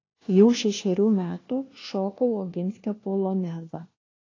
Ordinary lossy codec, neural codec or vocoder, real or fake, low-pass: AAC, 32 kbps; codec, 16 kHz, 1 kbps, FunCodec, trained on LibriTTS, 50 frames a second; fake; 7.2 kHz